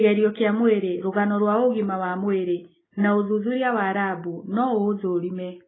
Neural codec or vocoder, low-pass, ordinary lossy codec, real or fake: none; 7.2 kHz; AAC, 16 kbps; real